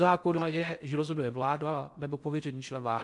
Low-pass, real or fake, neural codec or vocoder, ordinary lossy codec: 10.8 kHz; fake; codec, 16 kHz in and 24 kHz out, 0.6 kbps, FocalCodec, streaming, 4096 codes; MP3, 64 kbps